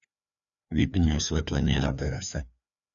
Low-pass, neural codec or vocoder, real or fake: 7.2 kHz; codec, 16 kHz, 2 kbps, FreqCodec, larger model; fake